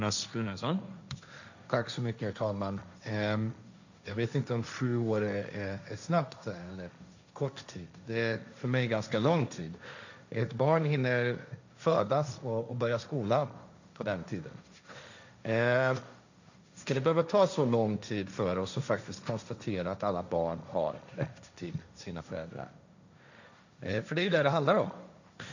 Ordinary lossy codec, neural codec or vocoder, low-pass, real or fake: none; codec, 16 kHz, 1.1 kbps, Voila-Tokenizer; 7.2 kHz; fake